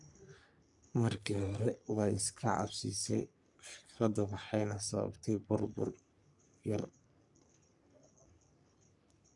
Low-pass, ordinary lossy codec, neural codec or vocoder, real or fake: 10.8 kHz; none; codec, 44.1 kHz, 3.4 kbps, Pupu-Codec; fake